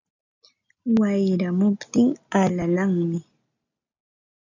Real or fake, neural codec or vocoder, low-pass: real; none; 7.2 kHz